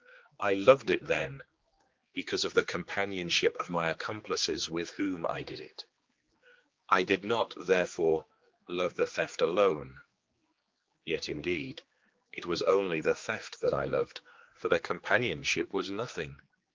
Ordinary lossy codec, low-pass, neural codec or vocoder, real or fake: Opus, 24 kbps; 7.2 kHz; codec, 16 kHz, 2 kbps, X-Codec, HuBERT features, trained on general audio; fake